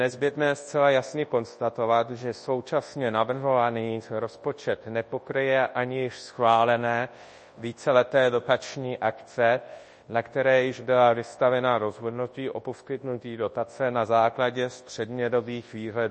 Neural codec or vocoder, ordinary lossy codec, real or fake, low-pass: codec, 24 kHz, 0.9 kbps, WavTokenizer, large speech release; MP3, 32 kbps; fake; 10.8 kHz